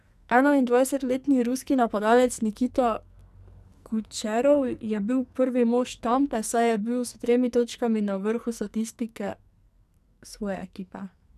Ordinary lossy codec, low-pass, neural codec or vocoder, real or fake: none; 14.4 kHz; codec, 44.1 kHz, 2.6 kbps, SNAC; fake